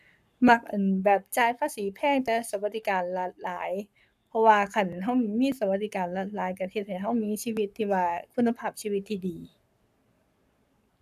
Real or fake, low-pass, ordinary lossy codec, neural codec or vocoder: fake; 14.4 kHz; none; codec, 44.1 kHz, 7.8 kbps, Pupu-Codec